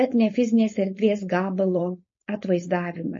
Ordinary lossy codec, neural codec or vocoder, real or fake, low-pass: MP3, 32 kbps; codec, 16 kHz, 4.8 kbps, FACodec; fake; 7.2 kHz